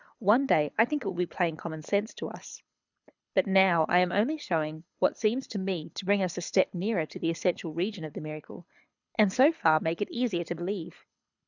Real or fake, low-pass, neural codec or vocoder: fake; 7.2 kHz; codec, 24 kHz, 6 kbps, HILCodec